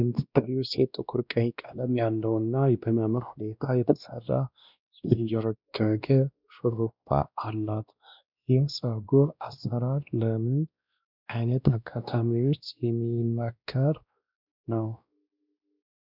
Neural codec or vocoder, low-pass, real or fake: codec, 16 kHz, 1 kbps, X-Codec, WavLM features, trained on Multilingual LibriSpeech; 5.4 kHz; fake